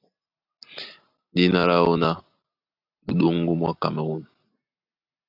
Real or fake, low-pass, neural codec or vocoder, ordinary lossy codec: real; 5.4 kHz; none; AAC, 48 kbps